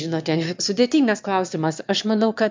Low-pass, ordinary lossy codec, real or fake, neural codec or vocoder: 7.2 kHz; MP3, 64 kbps; fake; autoencoder, 22.05 kHz, a latent of 192 numbers a frame, VITS, trained on one speaker